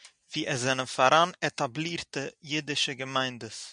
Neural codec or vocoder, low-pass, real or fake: none; 9.9 kHz; real